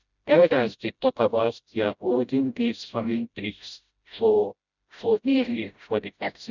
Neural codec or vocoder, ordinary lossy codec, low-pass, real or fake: codec, 16 kHz, 0.5 kbps, FreqCodec, smaller model; none; 7.2 kHz; fake